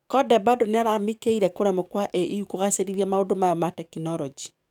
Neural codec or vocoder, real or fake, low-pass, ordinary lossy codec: codec, 44.1 kHz, 7.8 kbps, DAC; fake; 19.8 kHz; none